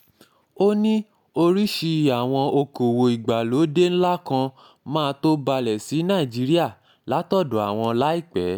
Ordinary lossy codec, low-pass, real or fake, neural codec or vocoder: none; 19.8 kHz; real; none